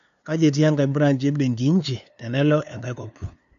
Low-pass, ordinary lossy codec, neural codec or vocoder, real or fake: 7.2 kHz; none; codec, 16 kHz, 2 kbps, FunCodec, trained on LibriTTS, 25 frames a second; fake